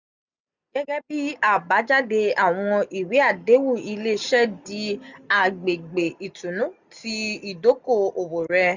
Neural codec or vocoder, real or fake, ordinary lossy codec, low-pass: none; real; none; 7.2 kHz